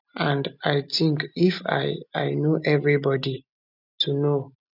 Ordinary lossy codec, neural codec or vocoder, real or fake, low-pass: none; none; real; 5.4 kHz